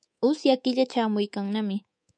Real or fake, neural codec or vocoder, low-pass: fake; codec, 24 kHz, 3.1 kbps, DualCodec; 9.9 kHz